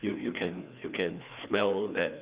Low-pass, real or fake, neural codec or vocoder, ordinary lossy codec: 3.6 kHz; fake; codec, 16 kHz, 2 kbps, FreqCodec, larger model; Opus, 64 kbps